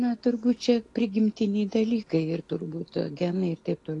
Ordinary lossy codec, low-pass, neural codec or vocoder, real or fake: AAC, 48 kbps; 10.8 kHz; none; real